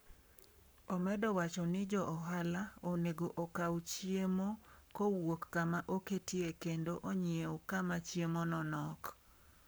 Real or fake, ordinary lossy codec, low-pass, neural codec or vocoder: fake; none; none; codec, 44.1 kHz, 7.8 kbps, Pupu-Codec